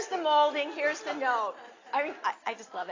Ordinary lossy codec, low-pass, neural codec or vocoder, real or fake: AAC, 32 kbps; 7.2 kHz; none; real